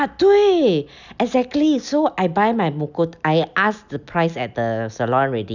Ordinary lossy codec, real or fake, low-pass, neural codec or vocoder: none; real; 7.2 kHz; none